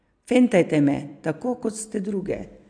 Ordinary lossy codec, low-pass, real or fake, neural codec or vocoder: AAC, 64 kbps; 9.9 kHz; real; none